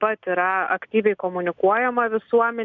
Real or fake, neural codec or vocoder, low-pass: real; none; 7.2 kHz